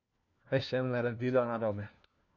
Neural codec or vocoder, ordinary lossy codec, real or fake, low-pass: codec, 16 kHz, 1 kbps, FunCodec, trained on LibriTTS, 50 frames a second; none; fake; 7.2 kHz